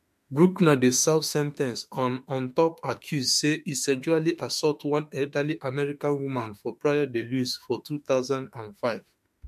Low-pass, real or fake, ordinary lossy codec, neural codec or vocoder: 14.4 kHz; fake; MP3, 64 kbps; autoencoder, 48 kHz, 32 numbers a frame, DAC-VAE, trained on Japanese speech